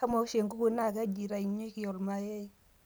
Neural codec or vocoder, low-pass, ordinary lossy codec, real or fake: none; none; none; real